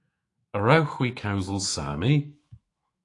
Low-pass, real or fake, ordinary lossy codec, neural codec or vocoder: 10.8 kHz; fake; AAC, 48 kbps; autoencoder, 48 kHz, 128 numbers a frame, DAC-VAE, trained on Japanese speech